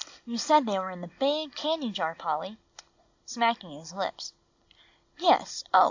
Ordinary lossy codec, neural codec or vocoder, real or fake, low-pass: AAC, 48 kbps; none; real; 7.2 kHz